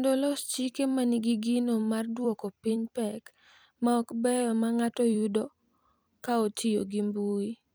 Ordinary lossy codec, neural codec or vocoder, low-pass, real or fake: none; vocoder, 44.1 kHz, 128 mel bands every 256 samples, BigVGAN v2; none; fake